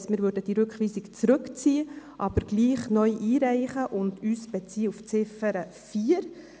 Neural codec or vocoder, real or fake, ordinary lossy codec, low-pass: none; real; none; none